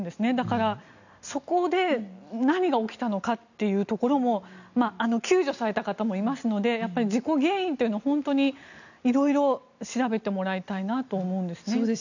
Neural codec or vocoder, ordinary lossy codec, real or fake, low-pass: none; none; real; 7.2 kHz